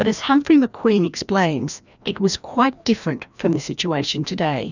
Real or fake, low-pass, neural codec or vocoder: fake; 7.2 kHz; codec, 16 kHz, 1 kbps, FreqCodec, larger model